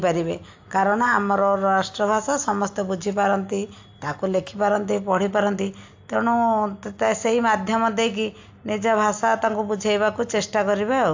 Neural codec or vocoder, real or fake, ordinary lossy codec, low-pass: none; real; AAC, 48 kbps; 7.2 kHz